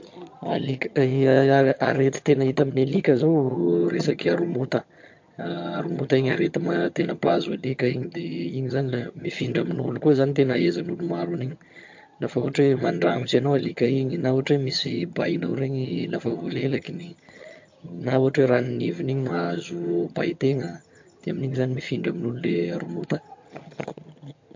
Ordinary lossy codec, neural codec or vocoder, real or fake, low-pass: MP3, 48 kbps; vocoder, 22.05 kHz, 80 mel bands, HiFi-GAN; fake; 7.2 kHz